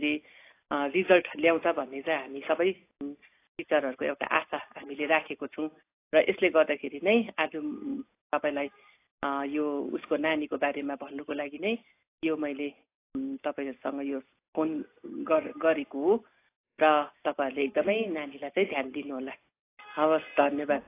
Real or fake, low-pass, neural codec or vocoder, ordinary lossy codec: real; 3.6 kHz; none; AAC, 24 kbps